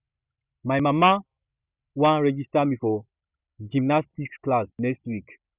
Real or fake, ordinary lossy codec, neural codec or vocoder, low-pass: real; Opus, 64 kbps; none; 3.6 kHz